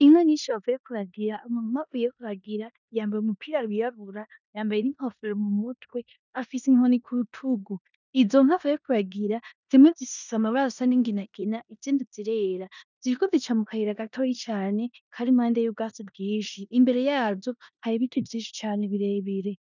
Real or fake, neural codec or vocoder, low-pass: fake; codec, 16 kHz in and 24 kHz out, 0.9 kbps, LongCat-Audio-Codec, four codebook decoder; 7.2 kHz